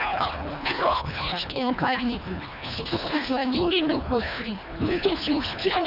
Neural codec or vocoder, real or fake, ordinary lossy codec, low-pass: codec, 24 kHz, 1.5 kbps, HILCodec; fake; none; 5.4 kHz